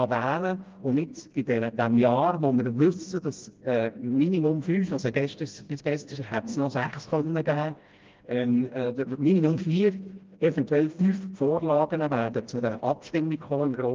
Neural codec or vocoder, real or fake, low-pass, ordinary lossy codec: codec, 16 kHz, 1 kbps, FreqCodec, smaller model; fake; 7.2 kHz; Opus, 24 kbps